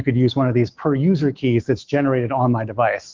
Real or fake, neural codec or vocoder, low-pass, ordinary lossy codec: fake; autoencoder, 48 kHz, 128 numbers a frame, DAC-VAE, trained on Japanese speech; 7.2 kHz; Opus, 32 kbps